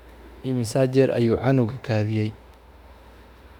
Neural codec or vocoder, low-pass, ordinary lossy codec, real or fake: autoencoder, 48 kHz, 32 numbers a frame, DAC-VAE, trained on Japanese speech; 19.8 kHz; Opus, 64 kbps; fake